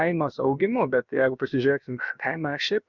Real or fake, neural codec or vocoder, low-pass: fake; codec, 16 kHz, about 1 kbps, DyCAST, with the encoder's durations; 7.2 kHz